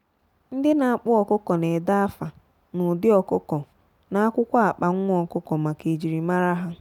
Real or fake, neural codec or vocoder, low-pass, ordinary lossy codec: real; none; 19.8 kHz; none